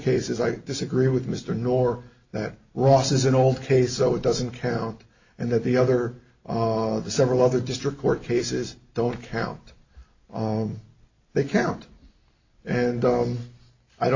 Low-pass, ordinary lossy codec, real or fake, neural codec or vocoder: 7.2 kHz; AAC, 48 kbps; real; none